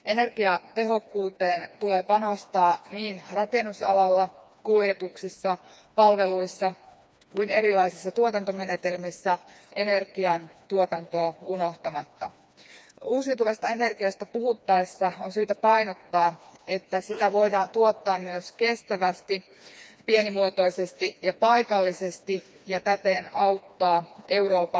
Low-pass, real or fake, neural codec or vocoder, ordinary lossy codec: none; fake; codec, 16 kHz, 2 kbps, FreqCodec, smaller model; none